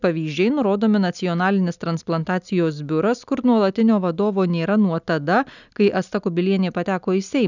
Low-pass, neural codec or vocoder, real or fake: 7.2 kHz; none; real